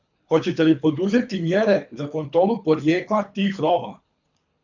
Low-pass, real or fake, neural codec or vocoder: 7.2 kHz; fake; codec, 24 kHz, 3 kbps, HILCodec